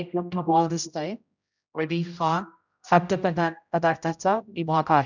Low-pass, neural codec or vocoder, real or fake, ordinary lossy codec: 7.2 kHz; codec, 16 kHz, 0.5 kbps, X-Codec, HuBERT features, trained on general audio; fake; none